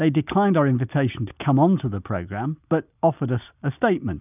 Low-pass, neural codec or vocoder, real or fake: 3.6 kHz; none; real